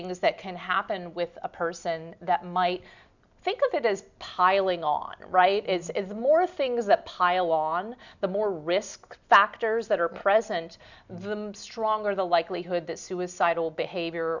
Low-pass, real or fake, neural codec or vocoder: 7.2 kHz; real; none